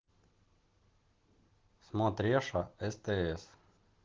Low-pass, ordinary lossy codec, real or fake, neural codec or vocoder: 7.2 kHz; Opus, 16 kbps; real; none